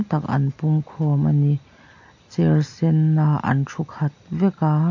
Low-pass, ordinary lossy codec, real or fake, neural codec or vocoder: 7.2 kHz; AAC, 48 kbps; real; none